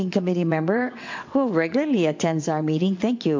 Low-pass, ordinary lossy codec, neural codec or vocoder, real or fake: 7.2 kHz; MP3, 48 kbps; vocoder, 22.05 kHz, 80 mel bands, WaveNeXt; fake